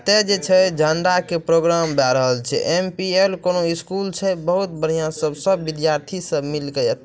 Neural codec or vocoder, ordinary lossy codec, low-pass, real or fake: none; none; none; real